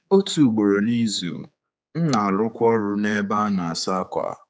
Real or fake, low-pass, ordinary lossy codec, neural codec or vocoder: fake; none; none; codec, 16 kHz, 4 kbps, X-Codec, HuBERT features, trained on general audio